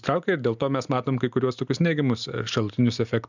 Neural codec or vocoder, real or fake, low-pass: none; real; 7.2 kHz